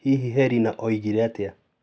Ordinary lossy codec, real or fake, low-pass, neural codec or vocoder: none; real; none; none